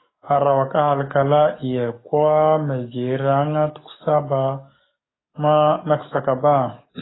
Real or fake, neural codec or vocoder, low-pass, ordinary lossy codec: fake; codec, 44.1 kHz, 7.8 kbps, DAC; 7.2 kHz; AAC, 16 kbps